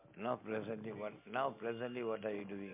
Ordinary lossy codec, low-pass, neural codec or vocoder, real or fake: MP3, 32 kbps; 3.6 kHz; none; real